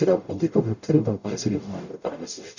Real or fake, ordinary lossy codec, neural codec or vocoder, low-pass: fake; none; codec, 44.1 kHz, 0.9 kbps, DAC; 7.2 kHz